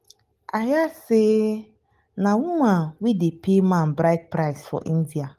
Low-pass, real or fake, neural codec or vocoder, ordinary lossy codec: 14.4 kHz; real; none; Opus, 24 kbps